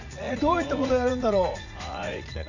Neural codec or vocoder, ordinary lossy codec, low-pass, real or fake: vocoder, 44.1 kHz, 80 mel bands, Vocos; none; 7.2 kHz; fake